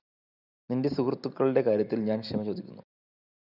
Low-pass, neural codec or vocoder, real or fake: 5.4 kHz; none; real